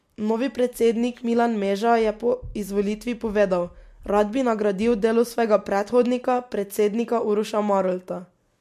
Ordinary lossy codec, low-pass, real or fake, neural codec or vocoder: MP3, 64 kbps; 14.4 kHz; real; none